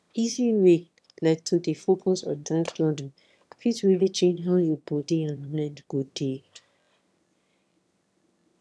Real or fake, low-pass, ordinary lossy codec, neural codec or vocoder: fake; none; none; autoencoder, 22.05 kHz, a latent of 192 numbers a frame, VITS, trained on one speaker